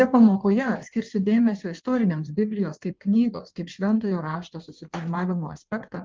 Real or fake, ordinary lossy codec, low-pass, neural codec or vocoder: fake; Opus, 32 kbps; 7.2 kHz; codec, 16 kHz in and 24 kHz out, 1.1 kbps, FireRedTTS-2 codec